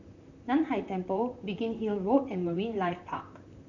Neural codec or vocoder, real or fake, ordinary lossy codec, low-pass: vocoder, 44.1 kHz, 128 mel bands, Pupu-Vocoder; fake; none; 7.2 kHz